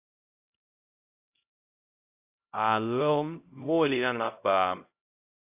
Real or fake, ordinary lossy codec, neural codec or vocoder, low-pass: fake; AAC, 32 kbps; codec, 16 kHz, 0.5 kbps, X-Codec, HuBERT features, trained on LibriSpeech; 3.6 kHz